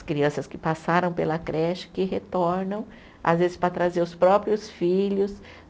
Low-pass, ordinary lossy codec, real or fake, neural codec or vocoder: none; none; real; none